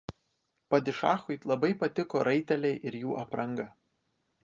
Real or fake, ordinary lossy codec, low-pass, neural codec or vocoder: real; Opus, 24 kbps; 7.2 kHz; none